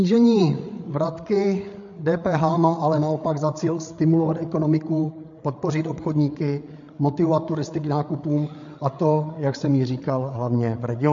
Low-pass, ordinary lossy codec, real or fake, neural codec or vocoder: 7.2 kHz; MP3, 48 kbps; fake; codec, 16 kHz, 8 kbps, FreqCodec, larger model